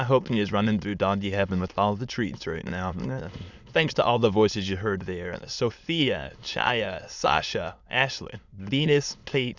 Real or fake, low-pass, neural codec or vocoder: fake; 7.2 kHz; autoencoder, 22.05 kHz, a latent of 192 numbers a frame, VITS, trained on many speakers